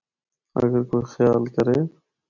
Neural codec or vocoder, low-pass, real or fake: none; 7.2 kHz; real